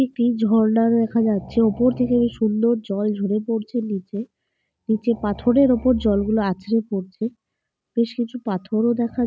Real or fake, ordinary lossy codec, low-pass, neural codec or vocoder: real; none; none; none